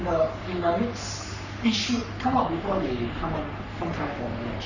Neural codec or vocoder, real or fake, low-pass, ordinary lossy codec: codec, 44.1 kHz, 3.4 kbps, Pupu-Codec; fake; 7.2 kHz; none